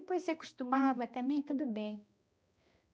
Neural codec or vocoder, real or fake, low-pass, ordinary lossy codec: codec, 16 kHz, 1 kbps, X-Codec, HuBERT features, trained on balanced general audio; fake; none; none